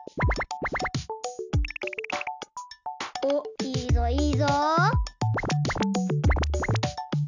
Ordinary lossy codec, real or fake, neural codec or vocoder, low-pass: none; real; none; 7.2 kHz